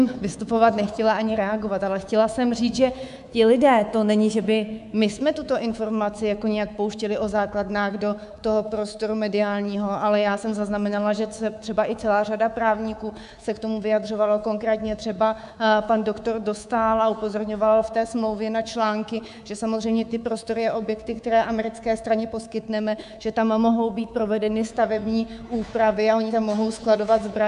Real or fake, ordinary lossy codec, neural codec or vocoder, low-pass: fake; AAC, 96 kbps; codec, 24 kHz, 3.1 kbps, DualCodec; 10.8 kHz